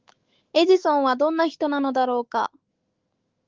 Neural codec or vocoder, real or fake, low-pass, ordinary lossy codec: autoencoder, 48 kHz, 128 numbers a frame, DAC-VAE, trained on Japanese speech; fake; 7.2 kHz; Opus, 16 kbps